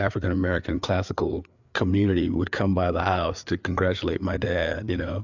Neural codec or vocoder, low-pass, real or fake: codec, 16 kHz, 4 kbps, FreqCodec, larger model; 7.2 kHz; fake